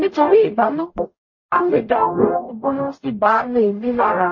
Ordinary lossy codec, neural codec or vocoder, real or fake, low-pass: MP3, 32 kbps; codec, 44.1 kHz, 0.9 kbps, DAC; fake; 7.2 kHz